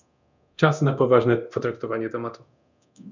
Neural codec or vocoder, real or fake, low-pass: codec, 24 kHz, 0.9 kbps, DualCodec; fake; 7.2 kHz